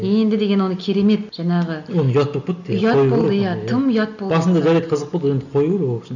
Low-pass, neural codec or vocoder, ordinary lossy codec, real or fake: 7.2 kHz; none; none; real